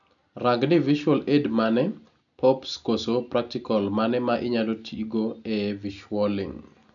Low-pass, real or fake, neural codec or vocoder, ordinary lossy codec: 7.2 kHz; real; none; none